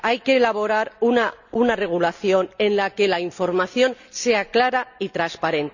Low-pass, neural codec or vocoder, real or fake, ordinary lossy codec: 7.2 kHz; none; real; none